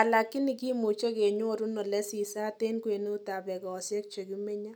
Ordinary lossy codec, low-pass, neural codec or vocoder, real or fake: none; none; none; real